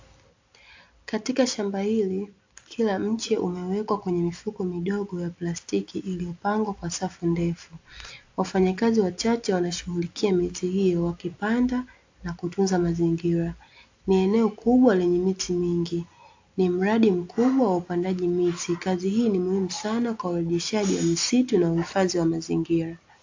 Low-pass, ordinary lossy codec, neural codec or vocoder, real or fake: 7.2 kHz; AAC, 48 kbps; none; real